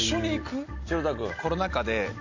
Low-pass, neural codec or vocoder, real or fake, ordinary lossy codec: 7.2 kHz; none; real; AAC, 48 kbps